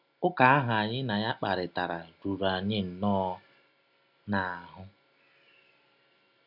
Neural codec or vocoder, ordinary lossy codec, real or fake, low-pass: none; none; real; 5.4 kHz